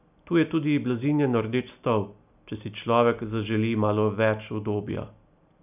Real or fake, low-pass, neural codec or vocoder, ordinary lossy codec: real; 3.6 kHz; none; none